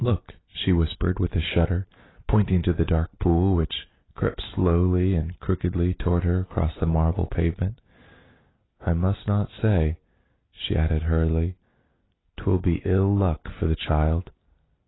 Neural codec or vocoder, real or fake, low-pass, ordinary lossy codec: none; real; 7.2 kHz; AAC, 16 kbps